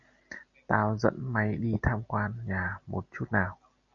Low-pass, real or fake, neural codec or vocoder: 7.2 kHz; real; none